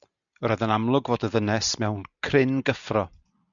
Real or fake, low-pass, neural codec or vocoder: real; 7.2 kHz; none